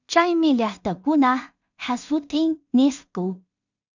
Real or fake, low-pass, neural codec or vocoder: fake; 7.2 kHz; codec, 16 kHz in and 24 kHz out, 0.4 kbps, LongCat-Audio-Codec, two codebook decoder